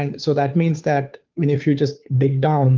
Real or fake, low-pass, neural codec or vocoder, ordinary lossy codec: fake; 7.2 kHz; codec, 16 kHz, 2 kbps, FunCodec, trained on Chinese and English, 25 frames a second; Opus, 24 kbps